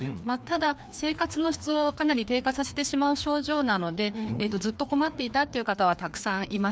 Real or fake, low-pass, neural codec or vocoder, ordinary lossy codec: fake; none; codec, 16 kHz, 2 kbps, FreqCodec, larger model; none